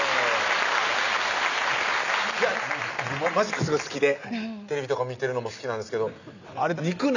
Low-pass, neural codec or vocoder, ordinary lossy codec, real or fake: 7.2 kHz; none; none; real